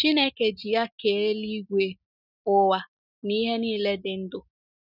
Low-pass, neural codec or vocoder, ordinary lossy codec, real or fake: 5.4 kHz; none; none; real